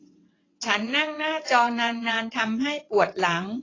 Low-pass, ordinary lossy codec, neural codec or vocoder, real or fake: 7.2 kHz; AAC, 32 kbps; vocoder, 22.05 kHz, 80 mel bands, WaveNeXt; fake